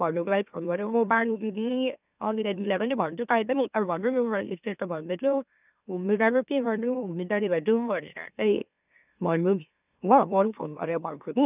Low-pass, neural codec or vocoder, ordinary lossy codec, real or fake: 3.6 kHz; autoencoder, 44.1 kHz, a latent of 192 numbers a frame, MeloTTS; none; fake